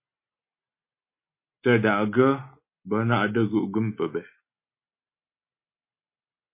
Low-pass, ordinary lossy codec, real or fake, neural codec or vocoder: 3.6 kHz; MP3, 24 kbps; real; none